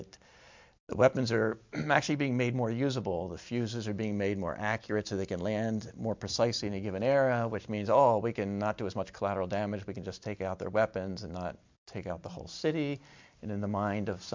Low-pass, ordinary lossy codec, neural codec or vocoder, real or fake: 7.2 kHz; MP3, 64 kbps; none; real